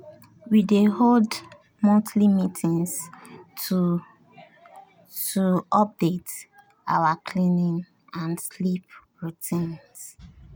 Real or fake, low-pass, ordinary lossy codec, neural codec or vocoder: real; none; none; none